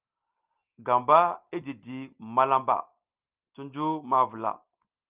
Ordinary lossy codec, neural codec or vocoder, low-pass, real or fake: Opus, 32 kbps; none; 3.6 kHz; real